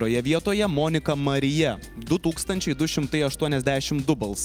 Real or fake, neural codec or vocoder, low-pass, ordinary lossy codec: real; none; 19.8 kHz; Opus, 32 kbps